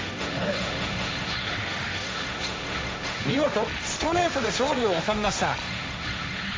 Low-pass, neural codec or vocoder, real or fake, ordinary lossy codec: none; codec, 16 kHz, 1.1 kbps, Voila-Tokenizer; fake; none